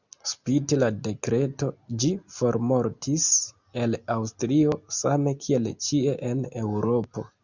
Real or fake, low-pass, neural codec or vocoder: real; 7.2 kHz; none